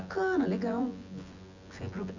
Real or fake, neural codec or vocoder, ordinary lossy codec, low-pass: fake; vocoder, 24 kHz, 100 mel bands, Vocos; none; 7.2 kHz